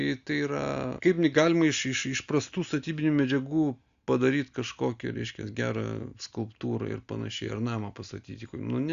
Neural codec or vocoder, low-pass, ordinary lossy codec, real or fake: none; 7.2 kHz; Opus, 64 kbps; real